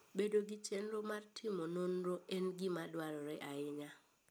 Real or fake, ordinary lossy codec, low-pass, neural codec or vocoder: real; none; none; none